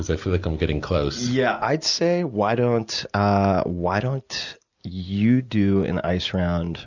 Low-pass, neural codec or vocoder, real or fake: 7.2 kHz; none; real